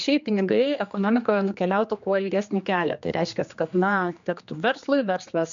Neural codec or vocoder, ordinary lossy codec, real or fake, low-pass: codec, 16 kHz, 2 kbps, X-Codec, HuBERT features, trained on general audio; MP3, 64 kbps; fake; 7.2 kHz